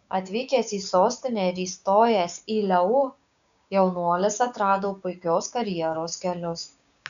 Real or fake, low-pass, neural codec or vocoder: fake; 7.2 kHz; codec, 16 kHz, 6 kbps, DAC